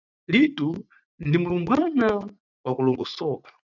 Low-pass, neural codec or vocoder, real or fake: 7.2 kHz; vocoder, 44.1 kHz, 80 mel bands, Vocos; fake